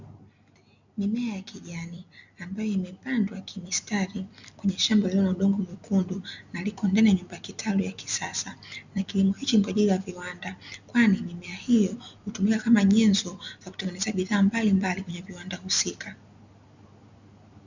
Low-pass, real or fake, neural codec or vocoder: 7.2 kHz; real; none